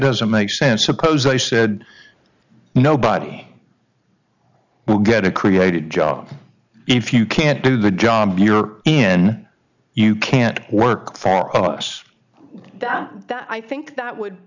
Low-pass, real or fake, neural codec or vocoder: 7.2 kHz; real; none